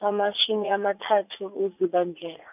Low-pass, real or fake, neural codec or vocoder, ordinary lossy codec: 3.6 kHz; fake; vocoder, 44.1 kHz, 80 mel bands, Vocos; none